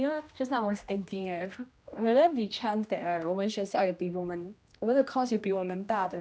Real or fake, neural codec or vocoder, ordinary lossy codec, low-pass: fake; codec, 16 kHz, 1 kbps, X-Codec, HuBERT features, trained on general audio; none; none